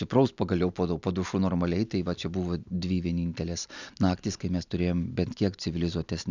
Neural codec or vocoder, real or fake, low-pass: none; real; 7.2 kHz